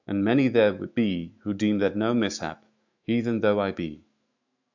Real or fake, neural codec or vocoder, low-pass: fake; autoencoder, 48 kHz, 128 numbers a frame, DAC-VAE, trained on Japanese speech; 7.2 kHz